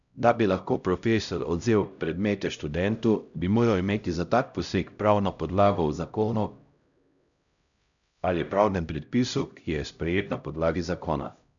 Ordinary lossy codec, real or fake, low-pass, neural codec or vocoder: none; fake; 7.2 kHz; codec, 16 kHz, 0.5 kbps, X-Codec, HuBERT features, trained on LibriSpeech